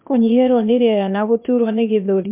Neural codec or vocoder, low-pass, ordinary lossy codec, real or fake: codec, 16 kHz, 0.8 kbps, ZipCodec; 3.6 kHz; MP3, 32 kbps; fake